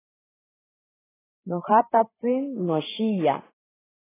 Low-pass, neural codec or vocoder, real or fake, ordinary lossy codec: 3.6 kHz; codec, 16 kHz, 8 kbps, FreqCodec, larger model; fake; AAC, 16 kbps